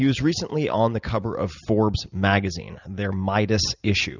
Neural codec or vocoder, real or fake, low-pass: none; real; 7.2 kHz